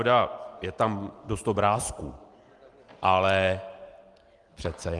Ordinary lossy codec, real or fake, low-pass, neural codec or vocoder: Opus, 24 kbps; real; 10.8 kHz; none